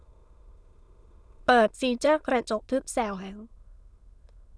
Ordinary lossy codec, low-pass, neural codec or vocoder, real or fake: none; none; autoencoder, 22.05 kHz, a latent of 192 numbers a frame, VITS, trained on many speakers; fake